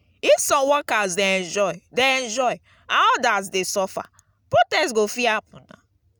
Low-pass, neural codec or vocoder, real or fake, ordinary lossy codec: none; none; real; none